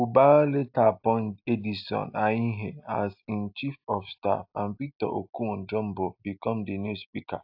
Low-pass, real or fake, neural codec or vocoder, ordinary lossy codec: 5.4 kHz; real; none; AAC, 48 kbps